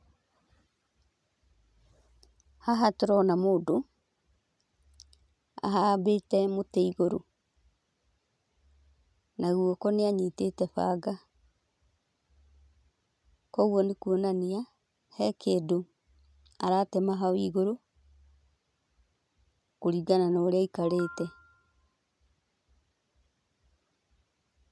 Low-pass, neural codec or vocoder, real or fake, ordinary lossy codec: none; none; real; none